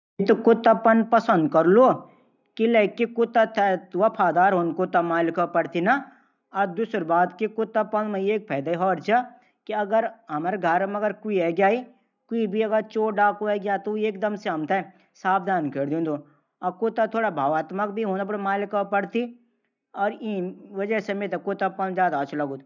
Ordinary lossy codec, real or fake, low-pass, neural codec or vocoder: none; real; 7.2 kHz; none